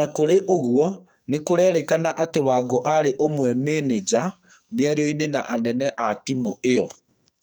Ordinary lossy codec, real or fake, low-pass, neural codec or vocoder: none; fake; none; codec, 44.1 kHz, 2.6 kbps, SNAC